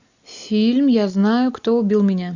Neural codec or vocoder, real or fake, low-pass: none; real; 7.2 kHz